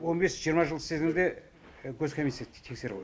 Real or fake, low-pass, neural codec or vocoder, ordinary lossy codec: real; none; none; none